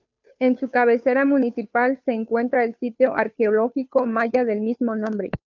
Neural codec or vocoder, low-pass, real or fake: codec, 16 kHz, 2 kbps, FunCodec, trained on Chinese and English, 25 frames a second; 7.2 kHz; fake